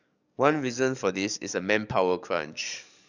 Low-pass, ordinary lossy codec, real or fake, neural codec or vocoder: 7.2 kHz; none; fake; codec, 44.1 kHz, 7.8 kbps, DAC